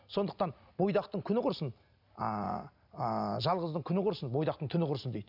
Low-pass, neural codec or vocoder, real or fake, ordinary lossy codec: 5.4 kHz; none; real; none